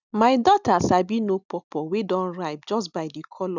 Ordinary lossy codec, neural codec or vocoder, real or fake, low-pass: none; none; real; 7.2 kHz